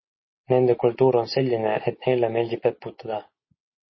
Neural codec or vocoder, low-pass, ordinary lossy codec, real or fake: none; 7.2 kHz; MP3, 24 kbps; real